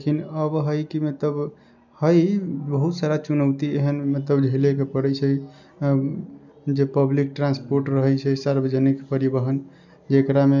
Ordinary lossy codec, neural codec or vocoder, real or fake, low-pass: none; none; real; 7.2 kHz